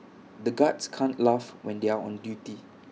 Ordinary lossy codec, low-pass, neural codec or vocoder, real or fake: none; none; none; real